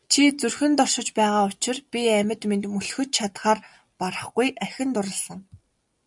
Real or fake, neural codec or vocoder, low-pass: real; none; 10.8 kHz